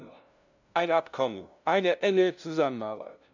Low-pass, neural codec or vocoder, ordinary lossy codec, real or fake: 7.2 kHz; codec, 16 kHz, 0.5 kbps, FunCodec, trained on LibriTTS, 25 frames a second; none; fake